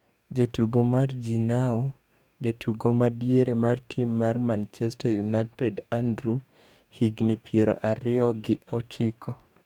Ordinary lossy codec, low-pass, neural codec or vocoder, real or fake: none; 19.8 kHz; codec, 44.1 kHz, 2.6 kbps, DAC; fake